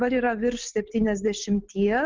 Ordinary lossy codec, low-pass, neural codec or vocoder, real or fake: Opus, 16 kbps; 7.2 kHz; none; real